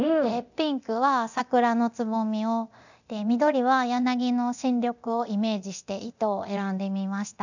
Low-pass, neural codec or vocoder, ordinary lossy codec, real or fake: 7.2 kHz; codec, 24 kHz, 0.9 kbps, DualCodec; none; fake